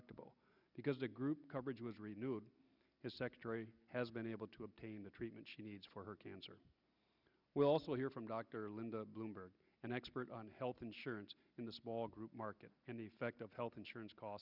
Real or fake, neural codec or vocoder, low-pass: real; none; 5.4 kHz